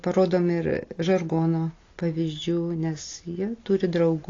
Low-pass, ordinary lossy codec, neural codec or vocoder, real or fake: 7.2 kHz; AAC, 32 kbps; none; real